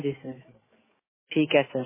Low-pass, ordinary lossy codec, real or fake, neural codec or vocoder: 3.6 kHz; MP3, 16 kbps; fake; autoencoder, 48 kHz, 128 numbers a frame, DAC-VAE, trained on Japanese speech